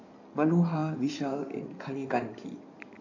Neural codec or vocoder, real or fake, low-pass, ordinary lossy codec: codec, 16 kHz in and 24 kHz out, 2.2 kbps, FireRedTTS-2 codec; fake; 7.2 kHz; AAC, 48 kbps